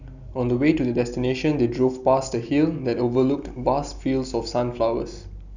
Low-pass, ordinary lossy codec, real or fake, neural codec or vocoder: 7.2 kHz; none; real; none